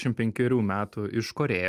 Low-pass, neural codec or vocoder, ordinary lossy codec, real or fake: 14.4 kHz; none; Opus, 32 kbps; real